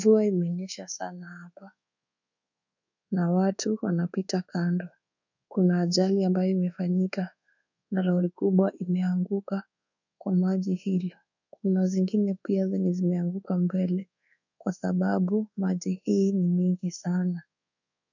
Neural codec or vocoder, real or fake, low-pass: codec, 24 kHz, 1.2 kbps, DualCodec; fake; 7.2 kHz